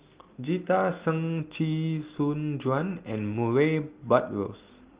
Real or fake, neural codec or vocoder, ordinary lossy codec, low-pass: real; none; Opus, 32 kbps; 3.6 kHz